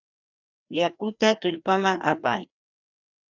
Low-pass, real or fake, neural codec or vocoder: 7.2 kHz; fake; codec, 16 kHz, 1 kbps, FreqCodec, larger model